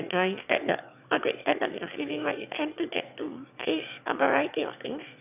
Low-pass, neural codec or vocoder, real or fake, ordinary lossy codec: 3.6 kHz; autoencoder, 22.05 kHz, a latent of 192 numbers a frame, VITS, trained on one speaker; fake; none